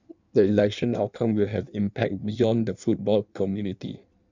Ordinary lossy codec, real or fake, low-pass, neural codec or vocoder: none; fake; 7.2 kHz; codec, 16 kHz in and 24 kHz out, 1.1 kbps, FireRedTTS-2 codec